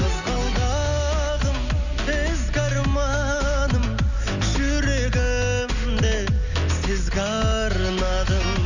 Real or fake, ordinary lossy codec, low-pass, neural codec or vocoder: real; none; 7.2 kHz; none